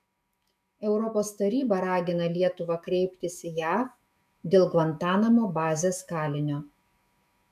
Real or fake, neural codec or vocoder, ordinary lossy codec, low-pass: fake; autoencoder, 48 kHz, 128 numbers a frame, DAC-VAE, trained on Japanese speech; MP3, 96 kbps; 14.4 kHz